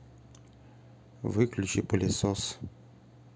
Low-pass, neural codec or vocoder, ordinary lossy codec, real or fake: none; none; none; real